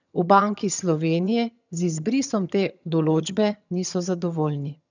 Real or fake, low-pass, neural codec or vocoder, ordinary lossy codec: fake; 7.2 kHz; vocoder, 22.05 kHz, 80 mel bands, HiFi-GAN; none